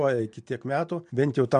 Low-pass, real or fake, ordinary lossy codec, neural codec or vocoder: 9.9 kHz; real; MP3, 64 kbps; none